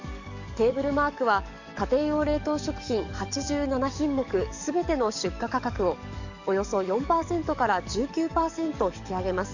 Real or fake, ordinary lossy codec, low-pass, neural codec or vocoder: fake; none; 7.2 kHz; codec, 44.1 kHz, 7.8 kbps, DAC